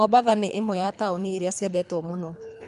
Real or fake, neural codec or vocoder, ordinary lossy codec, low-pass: fake; codec, 24 kHz, 3 kbps, HILCodec; MP3, 96 kbps; 10.8 kHz